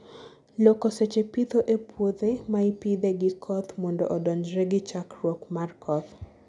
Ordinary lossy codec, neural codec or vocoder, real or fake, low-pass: none; none; real; 10.8 kHz